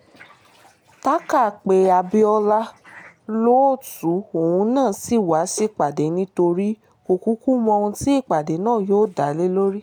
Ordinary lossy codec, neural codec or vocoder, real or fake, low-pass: none; none; real; none